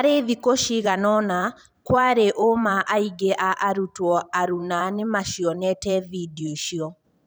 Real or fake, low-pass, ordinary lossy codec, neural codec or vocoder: fake; none; none; vocoder, 44.1 kHz, 128 mel bands every 512 samples, BigVGAN v2